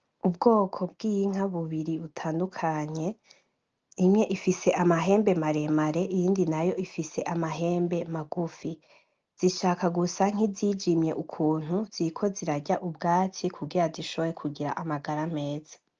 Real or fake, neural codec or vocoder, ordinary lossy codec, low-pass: real; none; Opus, 24 kbps; 7.2 kHz